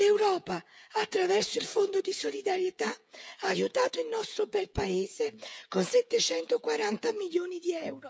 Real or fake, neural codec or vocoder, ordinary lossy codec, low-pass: fake; codec, 16 kHz, 16 kbps, FreqCodec, smaller model; none; none